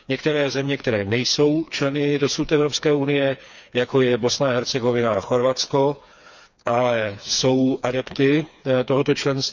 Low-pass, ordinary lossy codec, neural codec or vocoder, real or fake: 7.2 kHz; none; codec, 16 kHz, 4 kbps, FreqCodec, smaller model; fake